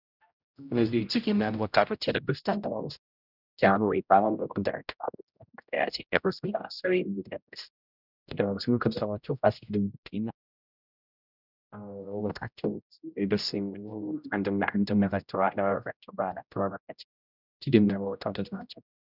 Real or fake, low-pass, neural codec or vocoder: fake; 5.4 kHz; codec, 16 kHz, 0.5 kbps, X-Codec, HuBERT features, trained on general audio